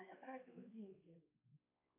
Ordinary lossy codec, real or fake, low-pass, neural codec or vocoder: AAC, 24 kbps; fake; 3.6 kHz; codec, 16 kHz, 2 kbps, X-Codec, WavLM features, trained on Multilingual LibriSpeech